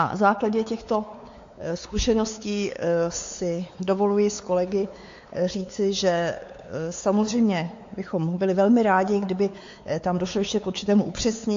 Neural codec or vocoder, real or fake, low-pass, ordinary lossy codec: codec, 16 kHz, 4 kbps, X-Codec, WavLM features, trained on Multilingual LibriSpeech; fake; 7.2 kHz; AAC, 48 kbps